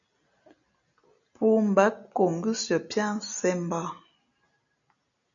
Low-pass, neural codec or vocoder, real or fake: 7.2 kHz; none; real